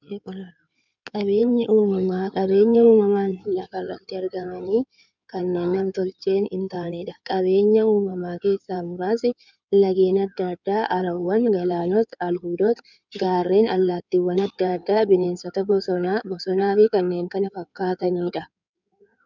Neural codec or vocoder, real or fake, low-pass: codec, 16 kHz in and 24 kHz out, 2.2 kbps, FireRedTTS-2 codec; fake; 7.2 kHz